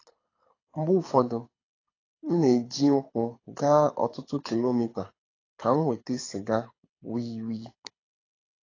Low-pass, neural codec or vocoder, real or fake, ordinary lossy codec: 7.2 kHz; codec, 24 kHz, 6 kbps, HILCodec; fake; AAC, 32 kbps